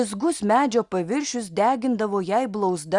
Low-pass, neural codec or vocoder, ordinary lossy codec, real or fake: 10.8 kHz; none; Opus, 64 kbps; real